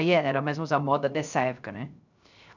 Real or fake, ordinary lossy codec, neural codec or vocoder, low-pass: fake; none; codec, 16 kHz, 0.3 kbps, FocalCodec; 7.2 kHz